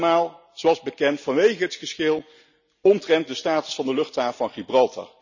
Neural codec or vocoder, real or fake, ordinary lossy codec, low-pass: none; real; none; 7.2 kHz